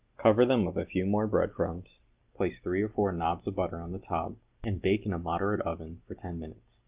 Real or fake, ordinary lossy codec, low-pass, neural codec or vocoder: real; Opus, 32 kbps; 3.6 kHz; none